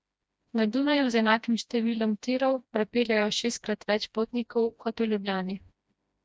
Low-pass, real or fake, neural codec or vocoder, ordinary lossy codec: none; fake; codec, 16 kHz, 1 kbps, FreqCodec, smaller model; none